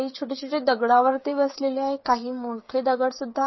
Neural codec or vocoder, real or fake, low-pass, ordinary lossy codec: codec, 16 kHz, 16 kbps, FreqCodec, smaller model; fake; 7.2 kHz; MP3, 24 kbps